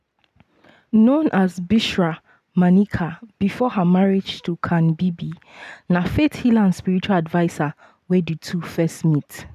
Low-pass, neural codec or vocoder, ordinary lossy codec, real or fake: 14.4 kHz; none; none; real